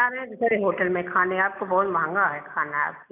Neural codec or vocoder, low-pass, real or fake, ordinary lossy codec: none; 3.6 kHz; real; none